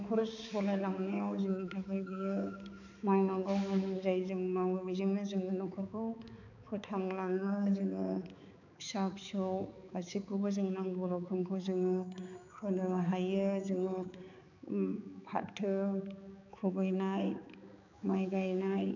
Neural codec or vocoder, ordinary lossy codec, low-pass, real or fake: codec, 16 kHz, 4 kbps, X-Codec, HuBERT features, trained on balanced general audio; none; 7.2 kHz; fake